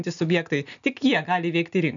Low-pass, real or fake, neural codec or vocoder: 7.2 kHz; real; none